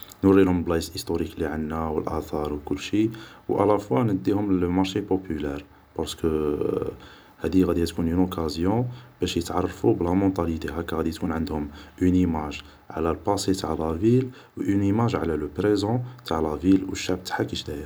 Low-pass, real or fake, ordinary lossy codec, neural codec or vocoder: none; real; none; none